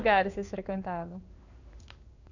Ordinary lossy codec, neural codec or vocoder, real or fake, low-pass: none; codec, 16 kHz in and 24 kHz out, 1 kbps, XY-Tokenizer; fake; 7.2 kHz